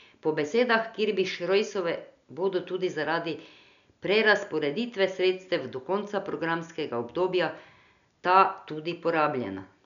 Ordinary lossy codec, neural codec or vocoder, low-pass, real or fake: none; none; 7.2 kHz; real